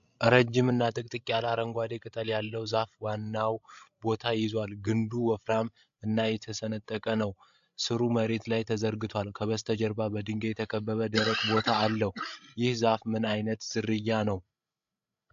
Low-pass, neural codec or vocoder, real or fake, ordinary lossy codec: 7.2 kHz; codec, 16 kHz, 16 kbps, FreqCodec, larger model; fake; AAC, 64 kbps